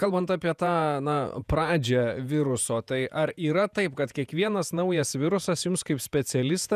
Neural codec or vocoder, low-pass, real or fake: vocoder, 48 kHz, 128 mel bands, Vocos; 14.4 kHz; fake